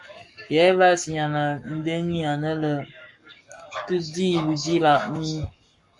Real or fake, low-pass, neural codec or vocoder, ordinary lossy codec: fake; 10.8 kHz; codec, 44.1 kHz, 7.8 kbps, Pupu-Codec; MP3, 64 kbps